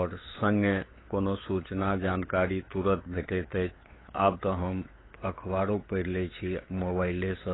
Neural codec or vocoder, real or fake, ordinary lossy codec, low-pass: codec, 16 kHz, 8 kbps, FunCodec, trained on LibriTTS, 25 frames a second; fake; AAC, 16 kbps; 7.2 kHz